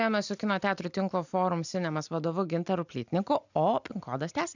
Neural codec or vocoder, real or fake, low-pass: none; real; 7.2 kHz